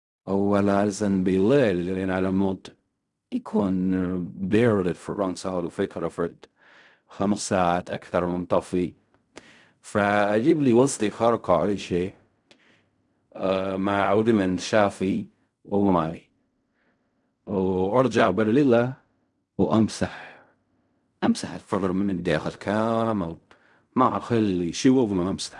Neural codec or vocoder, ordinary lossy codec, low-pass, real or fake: codec, 16 kHz in and 24 kHz out, 0.4 kbps, LongCat-Audio-Codec, fine tuned four codebook decoder; none; 10.8 kHz; fake